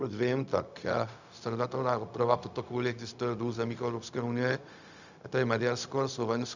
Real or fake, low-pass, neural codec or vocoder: fake; 7.2 kHz; codec, 16 kHz, 0.4 kbps, LongCat-Audio-Codec